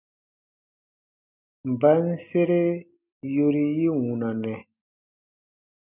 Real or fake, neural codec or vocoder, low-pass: real; none; 3.6 kHz